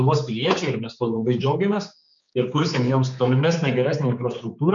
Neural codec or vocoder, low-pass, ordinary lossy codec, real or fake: codec, 16 kHz, 4 kbps, X-Codec, HuBERT features, trained on general audio; 7.2 kHz; MP3, 64 kbps; fake